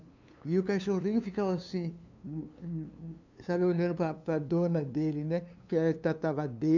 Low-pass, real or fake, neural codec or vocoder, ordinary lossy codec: 7.2 kHz; fake; codec, 16 kHz, 2 kbps, FunCodec, trained on LibriTTS, 25 frames a second; none